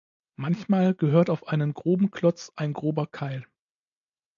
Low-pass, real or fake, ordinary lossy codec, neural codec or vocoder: 7.2 kHz; real; AAC, 64 kbps; none